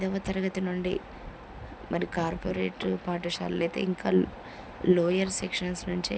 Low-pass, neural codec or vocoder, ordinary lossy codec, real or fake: none; none; none; real